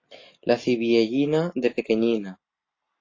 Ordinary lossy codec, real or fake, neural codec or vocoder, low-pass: AAC, 32 kbps; real; none; 7.2 kHz